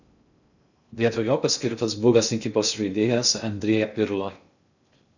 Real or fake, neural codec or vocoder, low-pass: fake; codec, 16 kHz in and 24 kHz out, 0.6 kbps, FocalCodec, streaming, 2048 codes; 7.2 kHz